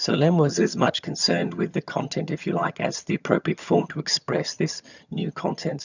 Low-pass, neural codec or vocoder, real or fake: 7.2 kHz; vocoder, 22.05 kHz, 80 mel bands, HiFi-GAN; fake